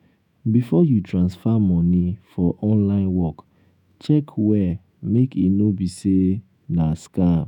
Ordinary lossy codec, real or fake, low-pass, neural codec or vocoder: none; fake; 19.8 kHz; autoencoder, 48 kHz, 128 numbers a frame, DAC-VAE, trained on Japanese speech